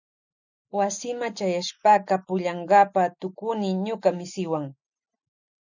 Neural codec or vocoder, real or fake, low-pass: none; real; 7.2 kHz